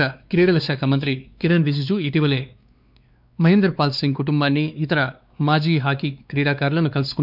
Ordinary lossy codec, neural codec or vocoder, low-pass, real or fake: none; codec, 16 kHz, 2 kbps, X-Codec, WavLM features, trained on Multilingual LibriSpeech; 5.4 kHz; fake